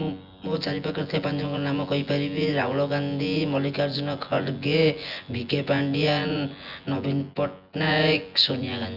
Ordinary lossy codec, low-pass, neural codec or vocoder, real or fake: none; 5.4 kHz; vocoder, 24 kHz, 100 mel bands, Vocos; fake